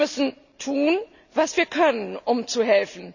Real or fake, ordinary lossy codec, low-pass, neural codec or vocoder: real; none; 7.2 kHz; none